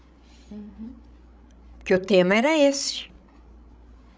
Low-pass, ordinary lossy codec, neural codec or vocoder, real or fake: none; none; codec, 16 kHz, 16 kbps, FreqCodec, larger model; fake